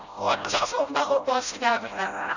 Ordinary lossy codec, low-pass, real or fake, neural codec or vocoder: none; 7.2 kHz; fake; codec, 16 kHz, 0.5 kbps, FreqCodec, smaller model